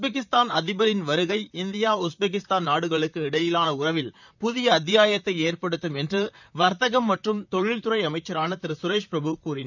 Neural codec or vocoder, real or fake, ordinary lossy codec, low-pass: vocoder, 44.1 kHz, 128 mel bands, Pupu-Vocoder; fake; none; 7.2 kHz